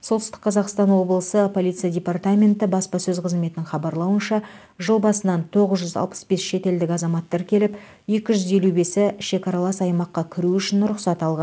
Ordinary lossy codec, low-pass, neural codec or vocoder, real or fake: none; none; none; real